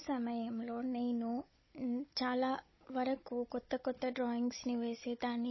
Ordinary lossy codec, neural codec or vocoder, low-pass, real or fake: MP3, 24 kbps; codec, 16 kHz, 16 kbps, FunCodec, trained on Chinese and English, 50 frames a second; 7.2 kHz; fake